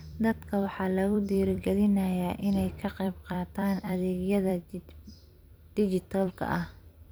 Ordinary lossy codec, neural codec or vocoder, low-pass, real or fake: none; vocoder, 44.1 kHz, 128 mel bands every 256 samples, BigVGAN v2; none; fake